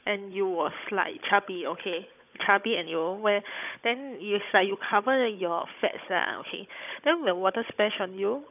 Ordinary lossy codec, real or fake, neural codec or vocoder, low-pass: none; fake; codec, 16 kHz, 16 kbps, FunCodec, trained on Chinese and English, 50 frames a second; 3.6 kHz